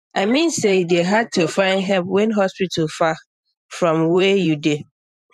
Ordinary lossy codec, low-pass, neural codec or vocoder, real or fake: none; 14.4 kHz; vocoder, 44.1 kHz, 128 mel bands every 512 samples, BigVGAN v2; fake